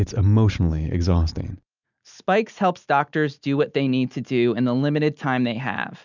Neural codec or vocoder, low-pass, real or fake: none; 7.2 kHz; real